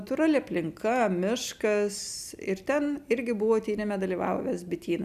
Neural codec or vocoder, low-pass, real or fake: none; 14.4 kHz; real